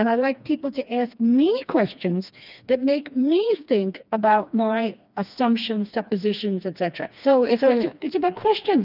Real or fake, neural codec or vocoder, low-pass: fake; codec, 16 kHz, 2 kbps, FreqCodec, smaller model; 5.4 kHz